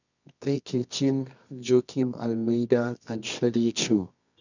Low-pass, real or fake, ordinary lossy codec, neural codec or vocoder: 7.2 kHz; fake; none; codec, 24 kHz, 0.9 kbps, WavTokenizer, medium music audio release